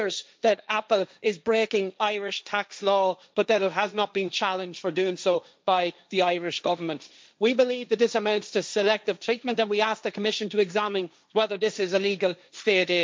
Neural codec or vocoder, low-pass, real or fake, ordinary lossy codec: codec, 16 kHz, 1.1 kbps, Voila-Tokenizer; none; fake; none